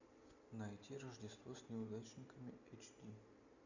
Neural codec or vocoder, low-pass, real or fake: none; 7.2 kHz; real